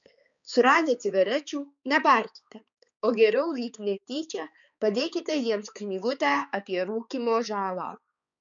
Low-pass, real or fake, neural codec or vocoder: 7.2 kHz; fake; codec, 16 kHz, 4 kbps, X-Codec, HuBERT features, trained on balanced general audio